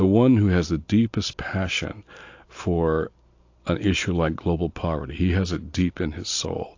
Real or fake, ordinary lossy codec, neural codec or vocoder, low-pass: real; AAC, 48 kbps; none; 7.2 kHz